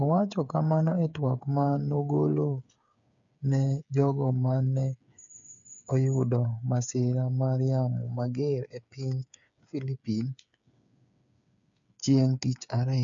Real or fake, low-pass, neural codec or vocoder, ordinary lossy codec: fake; 7.2 kHz; codec, 16 kHz, 8 kbps, FreqCodec, smaller model; none